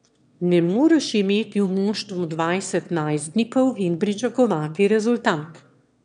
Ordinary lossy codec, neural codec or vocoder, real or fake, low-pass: none; autoencoder, 22.05 kHz, a latent of 192 numbers a frame, VITS, trained on one speaker; fake; 9.9 kHz